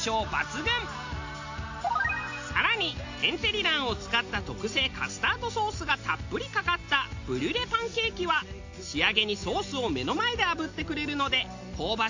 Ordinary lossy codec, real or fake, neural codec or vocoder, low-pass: AAC, 48 kbps; real; none; 7.2 kHz